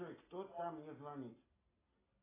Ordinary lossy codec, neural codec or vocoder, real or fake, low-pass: AAC, 16 kbps; none; real; 3.6 kHz